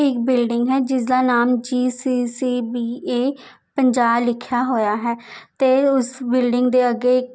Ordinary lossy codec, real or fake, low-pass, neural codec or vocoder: none; real; none; none